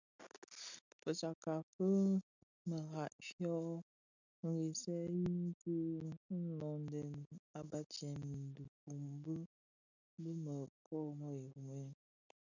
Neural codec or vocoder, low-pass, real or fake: none; 7.2 kHz; real